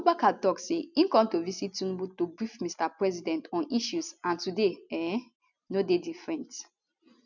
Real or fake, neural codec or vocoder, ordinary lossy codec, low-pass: real; none; none; 7.2 kHz